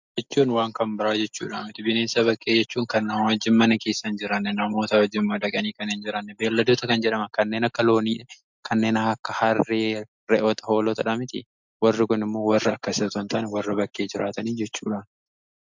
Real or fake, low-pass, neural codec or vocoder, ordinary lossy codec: real; 7.2 kHz; none; MP3, 64 kbps